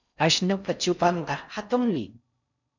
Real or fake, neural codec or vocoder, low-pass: fake; codec, 16 kHz in and 24 kHz out, 0.6 kbps, FocalCodec, streaming, 4096 codes; 7.2 kHz